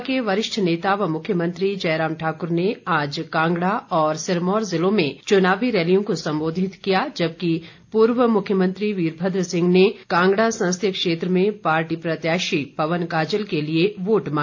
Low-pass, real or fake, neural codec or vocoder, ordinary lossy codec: 7.2 kHz; real; none; AAC, 48 kbps